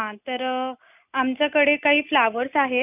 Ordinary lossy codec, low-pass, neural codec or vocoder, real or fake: none; 3.6 kHz; none; real